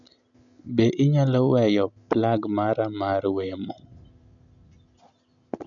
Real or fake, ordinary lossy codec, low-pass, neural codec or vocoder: real; none; 7.2 kHz; none